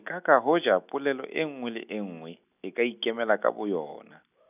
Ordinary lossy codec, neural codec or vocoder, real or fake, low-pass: none; none; real; 3.6 kHz